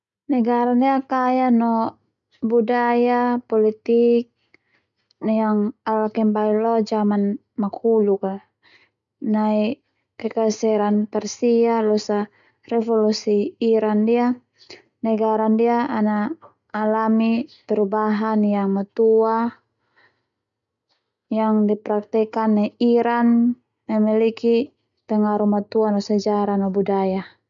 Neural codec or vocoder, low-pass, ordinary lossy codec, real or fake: none; 7.2 kHz; none; real